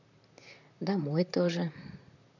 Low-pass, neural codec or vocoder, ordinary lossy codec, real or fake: 7.2 kHz; vocoder, 44.1 kHz, 128 mel bands, Pupu-Vocoder; none; fake